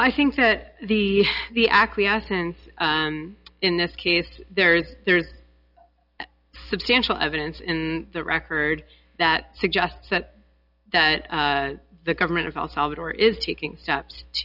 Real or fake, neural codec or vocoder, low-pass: real; none; 5.4 kHz